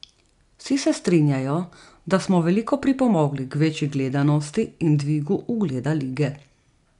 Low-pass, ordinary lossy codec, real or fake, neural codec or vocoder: 10.8 kHz; none; real; none